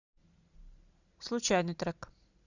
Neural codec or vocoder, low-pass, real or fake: none; 7.2 kHz; real